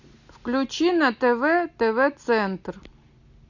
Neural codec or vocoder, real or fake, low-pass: none; real; 7.2 kHz